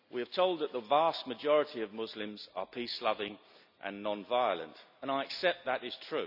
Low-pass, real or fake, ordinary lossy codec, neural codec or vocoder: 5.4 kHz; real; none; none